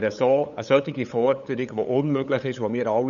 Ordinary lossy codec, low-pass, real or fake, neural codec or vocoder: none; 7.2 kHz; fake; codec, 16 kHz, 8 kbps, FunCodec, trained on LibriTTS, 25 frames a second